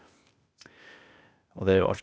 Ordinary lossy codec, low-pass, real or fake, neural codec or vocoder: none; none; fake; codec, 16 kHz, 0.8 kbps, ZipCodec